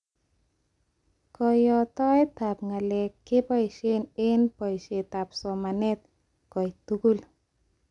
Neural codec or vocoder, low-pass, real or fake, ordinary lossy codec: none; 10.8 kHz; real; none